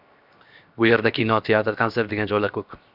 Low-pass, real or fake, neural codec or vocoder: 5.4 kHz; fake; codec, 16 kHz, 0.7 kbps, FocalCodec